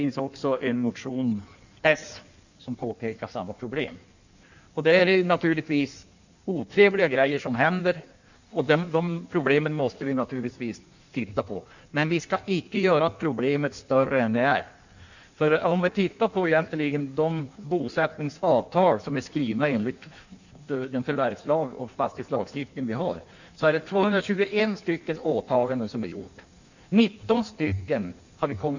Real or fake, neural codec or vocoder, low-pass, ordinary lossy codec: fake; codec, 16 kHz in and 24 kHz out, 1.1 kbps, FireRedTTS-2 codec; 7.2 kHz; none